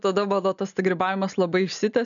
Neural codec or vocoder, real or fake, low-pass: none; real; 7.2 kHz